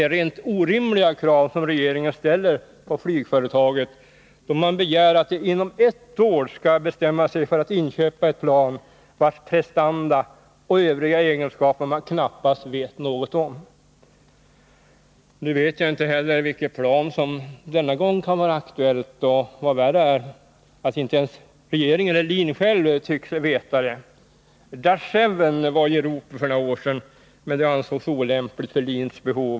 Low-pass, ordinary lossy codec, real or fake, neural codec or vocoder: none; none; real; none